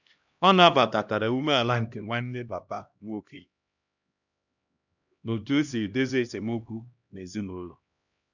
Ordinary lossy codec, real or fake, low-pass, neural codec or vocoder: none; fake; 7.2 kHz; codec, 16 kHz, 1 kbps, X-Codec, HuBERT features, trained on LibriSpeech